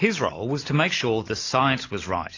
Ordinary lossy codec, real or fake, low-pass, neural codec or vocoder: AAC, 32 kbps; real; 7.2 kHz; none